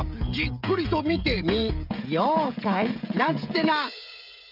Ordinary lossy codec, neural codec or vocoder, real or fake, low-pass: none; codec, 16 kHz, 16 kbps, FreqCodec, smaller model; fake; 5.4 kHz